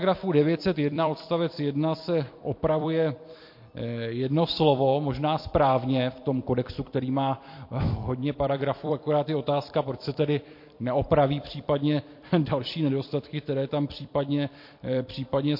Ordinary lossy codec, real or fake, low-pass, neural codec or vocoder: MP3, 32 kbps; fake; 5.4 kHz; vocoder, 44.1 kHz, 128 mel bands every 256 samples, BigVGAN v2